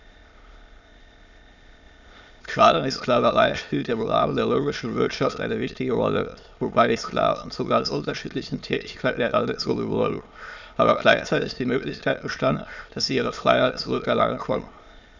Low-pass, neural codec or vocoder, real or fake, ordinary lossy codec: 7.2 kHz; autoencoder, 22.05 kHz, a latent of 192 numbers a frame, VITS, trained on many speakers; fake; none